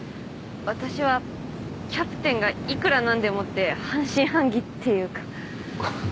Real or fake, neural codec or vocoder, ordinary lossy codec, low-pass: real; none; none; none